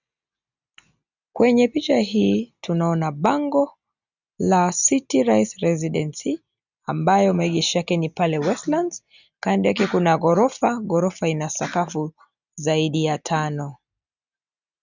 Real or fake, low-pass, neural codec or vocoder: real; 7.2 kHz; none